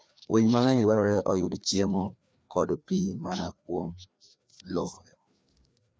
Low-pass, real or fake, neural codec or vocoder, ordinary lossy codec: none; fake; codec, 16 kHz, 2 kbps, FreqCodec, larger model; none